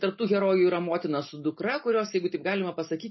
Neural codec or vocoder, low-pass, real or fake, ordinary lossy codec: none; 7.2 kHz; real; MP3, 24 kbps